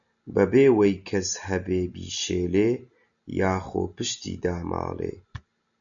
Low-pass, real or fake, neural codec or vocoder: 7.2 kHz; real; none